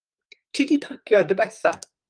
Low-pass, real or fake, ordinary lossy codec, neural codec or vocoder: 9.9 kHz; fake; Opus, 32 kbps; codec, 44.1 kHz, 2.6 kbps, SNAC